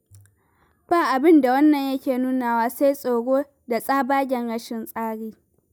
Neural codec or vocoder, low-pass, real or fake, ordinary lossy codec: none; none; real; none